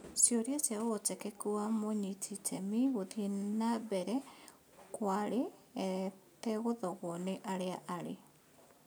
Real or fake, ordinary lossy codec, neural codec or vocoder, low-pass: real; none; none; none